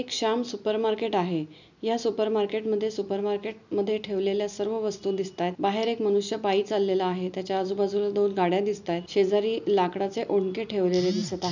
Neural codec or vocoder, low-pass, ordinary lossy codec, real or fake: none; 7.2 kHz; none; real